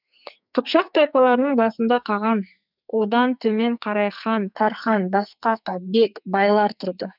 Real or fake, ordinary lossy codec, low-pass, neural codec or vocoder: fake; none; 5.4 kHz; codec, 44.1 kHz, 2.6 kbps, SNAC